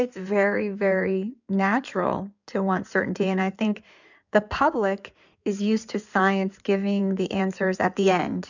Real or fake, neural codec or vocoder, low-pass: fake; codec, 16 kHz in and 24 kHz out, 2.2 kbps, FireRedTTS-2 codec; 7.2 kHz